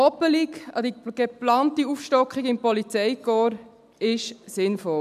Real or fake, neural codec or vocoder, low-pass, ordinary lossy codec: real; none; 14.4 kHz; none